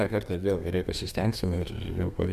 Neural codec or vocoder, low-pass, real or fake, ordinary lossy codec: codec, 32 kHz, 1.9 kbps, SNAC; 14.4 kHz; fake; MP3, 96 kbps